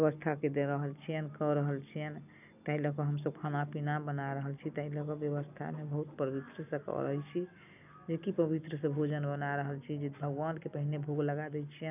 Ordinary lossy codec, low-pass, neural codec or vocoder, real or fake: none; 3.6 kHz; none; real